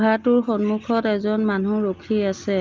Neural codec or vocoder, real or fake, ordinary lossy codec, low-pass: none; real; Opus, 32 kbps; 7.2 kHz